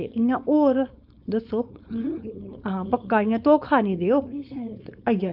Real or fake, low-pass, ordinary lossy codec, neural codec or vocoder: fake; 5.4 kHz; none; codec, 16 kHz, 4.8 kbps, FACodec